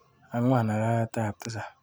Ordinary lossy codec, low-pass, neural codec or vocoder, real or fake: none; none; none; real